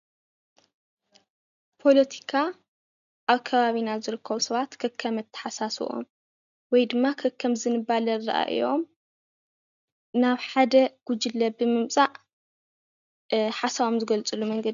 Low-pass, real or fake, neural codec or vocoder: 7.2 kHz; real; none